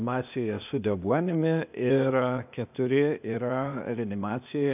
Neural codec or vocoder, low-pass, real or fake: codec, 16 kHz, 0.8 kbps, ZipCodec; 3.6 kHz; fake